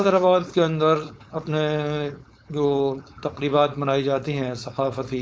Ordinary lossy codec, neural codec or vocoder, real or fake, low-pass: none; codec, 16 kHz, 4.8 kbps, FACodec; fake; none